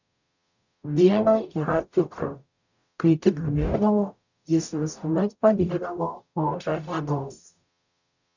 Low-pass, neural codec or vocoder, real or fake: 7.2 kHz; codec, 44.1 kHz, 0.9 kbps, DAC; fake